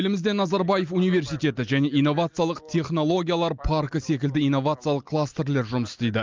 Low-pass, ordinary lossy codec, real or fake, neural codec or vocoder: 7.2 kHz; Opus, 24 kbps; real; none